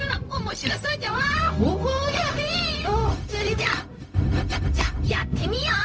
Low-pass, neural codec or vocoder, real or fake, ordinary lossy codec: none; codec, 16 kHz, 0.4 kbps, LongCat-Audio-Codec; fake; none